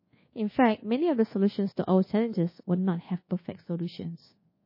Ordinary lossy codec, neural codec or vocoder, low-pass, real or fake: MP3, 24 kbps; codec, 24 kHz, 1.2 kbps, DualCodec; 5.4 kHz; fake